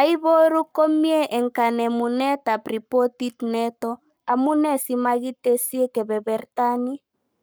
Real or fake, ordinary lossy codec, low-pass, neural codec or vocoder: fake; none; none; codec, 44.1 kHz, 7.8 kbps, Pupu-Codec